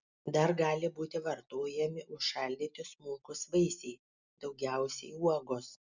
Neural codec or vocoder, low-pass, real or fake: none; 7.2 kHz; real